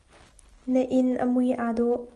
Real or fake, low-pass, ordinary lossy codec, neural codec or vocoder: real; 10.8 kHz; Opus, 32 kbps; none